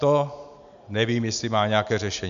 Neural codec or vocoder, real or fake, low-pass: none; real; 7.2 kHz